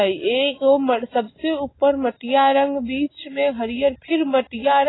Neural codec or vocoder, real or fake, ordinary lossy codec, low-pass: none; real; AAC, 16 kbps; 7.2 kHz